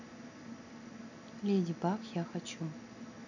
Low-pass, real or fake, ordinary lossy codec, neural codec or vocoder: 7.2 kHz; real; none; none